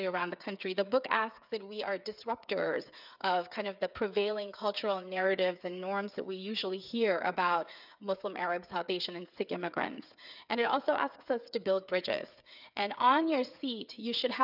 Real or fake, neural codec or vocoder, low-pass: fake; codec, 16 kHz, 8 kbps, FreqCodec, smaller model; 5.4 kHz